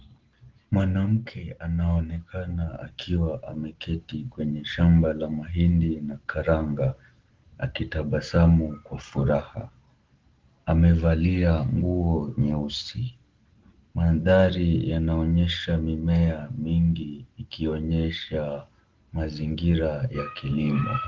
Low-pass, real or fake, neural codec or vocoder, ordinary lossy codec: 7.2 kHz; real; none; Opus, 16 kbps